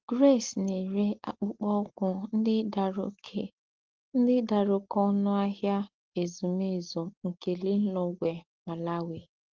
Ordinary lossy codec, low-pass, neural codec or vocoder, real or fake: Opus, 16 kbps; 7.2 kHz; none; real